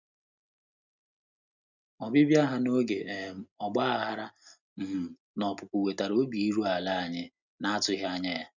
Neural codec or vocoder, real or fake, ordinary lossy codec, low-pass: none; real; none; 7.2 kHz